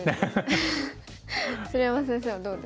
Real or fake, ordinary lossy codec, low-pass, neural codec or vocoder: real; none; none; none